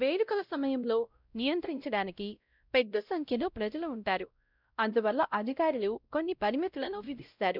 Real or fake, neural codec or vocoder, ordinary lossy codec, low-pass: fake; codec, 16 kHz, 0.5 kbps, X-Codec, WavLM features, trained on Multilingual LibriSpeech; none; 5.4 kHz